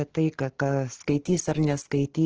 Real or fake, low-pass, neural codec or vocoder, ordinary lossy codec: real; 7.2 kHz; none; Opus, 16 kbps